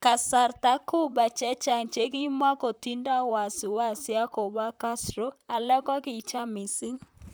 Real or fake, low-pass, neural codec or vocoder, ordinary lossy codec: fake; none; vocoder, 44.1 kHz, 128 mel bands, Pupu-Vocoder; none